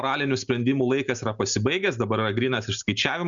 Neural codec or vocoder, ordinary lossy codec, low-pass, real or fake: none; Opus, 64 kbps; 7.2 kHz; real